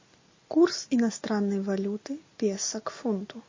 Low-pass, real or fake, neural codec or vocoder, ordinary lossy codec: 7.2 kHz; real; none; MP3, 32 kbps